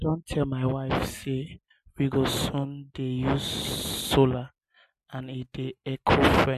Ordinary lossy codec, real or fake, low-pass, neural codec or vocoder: MP3, 64 kbps; real; 14.4 kHz; none